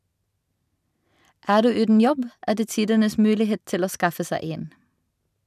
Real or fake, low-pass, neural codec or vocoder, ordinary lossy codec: real; 14.4 kHz; none; none